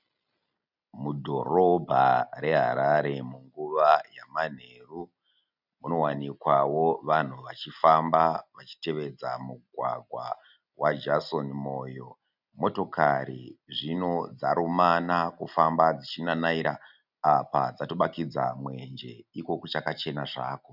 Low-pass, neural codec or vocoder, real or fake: 5.4 kHz; none; real